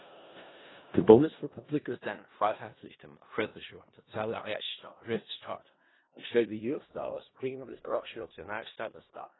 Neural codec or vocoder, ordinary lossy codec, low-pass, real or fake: codec, 16 kHz in and 24 kHz out, 0.4 kbps, LongCat-Audio-Codec, four codebook decoder; AAC, 16 kbps; 7.2 kHz; fake